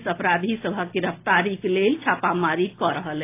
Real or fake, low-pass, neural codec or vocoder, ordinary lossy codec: real; 3.6 kHz; none; AAC, 24 kbps